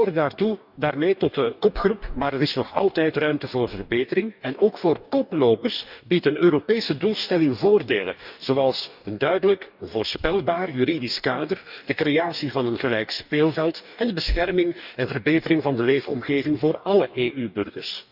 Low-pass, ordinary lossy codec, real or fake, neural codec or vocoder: 5.4 kHz; none; fake; codec, 44.1 kHz, 2.6 kbps, DAC